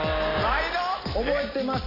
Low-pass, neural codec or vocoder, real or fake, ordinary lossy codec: 5.4 kHz; none; real; AAC, 24 kbps